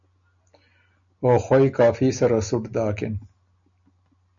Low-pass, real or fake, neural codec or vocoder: 7.2 kHz; real; none